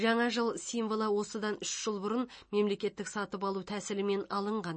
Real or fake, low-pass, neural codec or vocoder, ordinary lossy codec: real; 9.9 kHz; none; MP3, 32 kbps